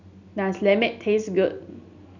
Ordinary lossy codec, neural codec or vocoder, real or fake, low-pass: none; none; real; 7.2 kHz